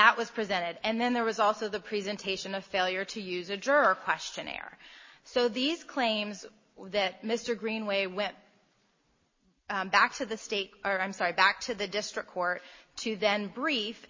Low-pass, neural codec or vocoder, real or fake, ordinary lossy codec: 7.2 kHz; none; real; MP3, 32 kbps